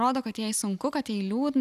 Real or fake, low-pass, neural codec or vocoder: real; 14.4 kHz; none